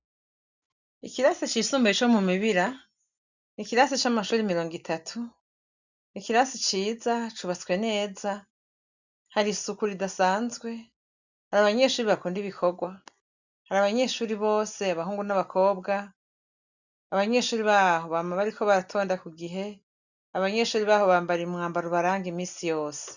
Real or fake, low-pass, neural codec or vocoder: real; 7.2 kHz; none